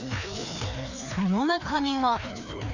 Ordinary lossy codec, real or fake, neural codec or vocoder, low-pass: none; fake; codec, 16 kHz, 2 kbps, FreqCodec, larger model; 7.2 kHz